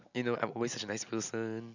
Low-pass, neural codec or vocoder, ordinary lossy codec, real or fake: 7.2 kHz; none; none; real